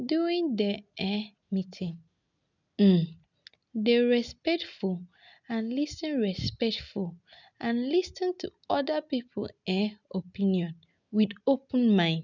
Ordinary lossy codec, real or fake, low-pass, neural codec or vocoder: none; real; 7.2 kHz; none